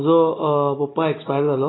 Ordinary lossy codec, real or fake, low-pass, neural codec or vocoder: AAC, 16 kbps; real; 7.2 kHz; none